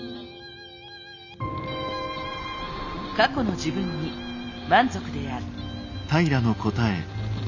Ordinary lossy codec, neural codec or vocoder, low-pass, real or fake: none; none; 7.2 kHz; real